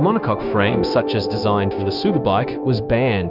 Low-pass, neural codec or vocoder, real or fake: 5.4 kHz; codec, 16 kHz, 0.9 kbps, LongCat-Audio-Codec; fake